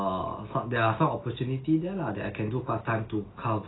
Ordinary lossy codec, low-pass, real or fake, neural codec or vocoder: AAC, 16 kbps; 7.2 kHz; real; none